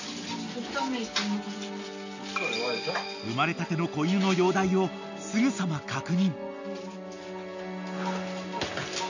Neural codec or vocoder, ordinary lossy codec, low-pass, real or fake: none; none; 7.2 kHz; real